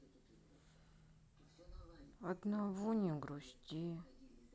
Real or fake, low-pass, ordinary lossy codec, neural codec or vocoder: real; none; none; none